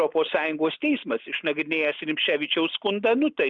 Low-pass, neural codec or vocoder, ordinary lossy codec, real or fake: 7.2 kHz; none; Opus, 64 kbps; real